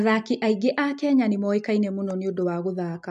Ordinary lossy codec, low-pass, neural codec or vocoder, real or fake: MP3, 64 kbps; 10.8 kHz; none; real